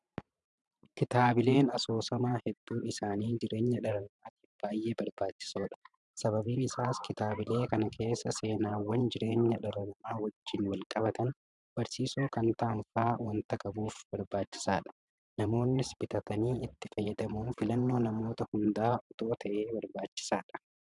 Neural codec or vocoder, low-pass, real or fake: vocoder, 44.1 kHz, 128 mel bands every 512 samples, BigVGAN v2; 10.8 kHz; fake